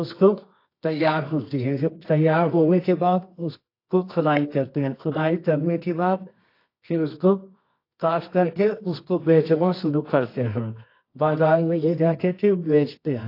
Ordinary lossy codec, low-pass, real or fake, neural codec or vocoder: AAC, 32 kbps; 5.4 kHz; fake; codec, 24 kHz, 0.9 kbps, WavTokenizer, medium music audio release